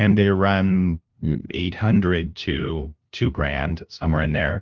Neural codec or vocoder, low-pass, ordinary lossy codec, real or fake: codec, 16 kHz, 0.5 kbps, FunCodec, trained on LibriTTS, 25 frames a second; 7.2 kHz; Opus, 24 kbps; fake